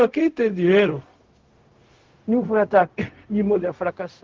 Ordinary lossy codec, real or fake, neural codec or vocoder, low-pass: Opus, 16 kbps; fake; codec, 16 kHz, 0.4 kbps, LongCat-Audio-Codec; 7.2 kHz